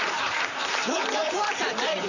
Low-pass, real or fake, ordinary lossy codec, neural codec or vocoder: 7.2 kHz; fake; none; vocoder, 44.1 kHz, 128 mel bands, Pupu-Vocoder